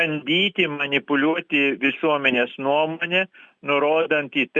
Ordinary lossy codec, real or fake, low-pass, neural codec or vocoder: Opus, 64 kbps; real; 9.9 kHz; none